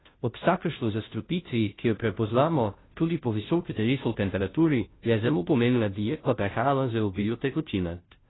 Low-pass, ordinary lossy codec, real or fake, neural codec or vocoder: 7.2 kHz; AAC, 16 kbps; fake; codec, 16 kHz, 0.5 kbps, FunCodec, trained on Chinese and English, 25 frames a second